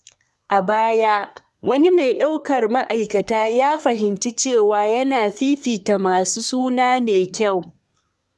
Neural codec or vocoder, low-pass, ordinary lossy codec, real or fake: codec, 24 kHz, 1 kbps, SNAC; none; none; fake